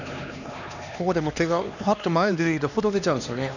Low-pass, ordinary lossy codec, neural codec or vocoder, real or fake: 7.2 kHz; none; codec, 16 kHz, 2 kbps, X-Codec, HuBERT features, trained on LibriSpeech; fake